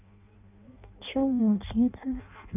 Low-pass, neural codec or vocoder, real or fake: 3.6 kHz; codec, 16 kHz in and 24 kHz out, 0.6 kbps, FireRedTTS-2 codec; fake